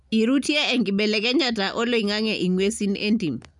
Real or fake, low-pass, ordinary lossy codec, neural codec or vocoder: real; 10.8 kHz; none; none